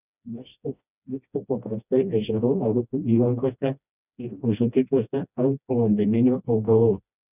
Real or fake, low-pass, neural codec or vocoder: fake; 3.6 kHz; codec, 16 kHz, 1 kbps, FreqCodec, smaller model